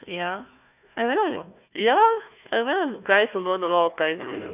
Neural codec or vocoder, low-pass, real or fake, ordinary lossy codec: codec, 16 kHz, 1 kbps, FunCodec, trained on Chinese and English, 50 frames a second; 3.6 kHz; fake; none